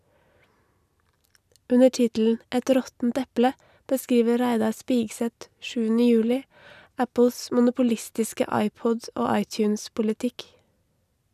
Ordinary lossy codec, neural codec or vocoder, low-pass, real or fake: none; none; 14.4 kHz; real